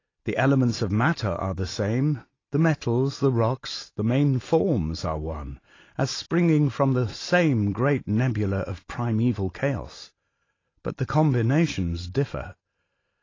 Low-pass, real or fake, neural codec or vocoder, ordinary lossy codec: 7.2 kHz; real; none; AAC, 32 kbps